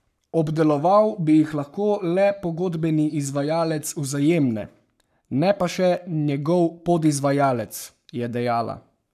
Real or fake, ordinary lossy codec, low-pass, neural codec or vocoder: fake; none; 14.4 kHz; codec, 44.1 kHz, 7.8 kbps, Pupu-Codec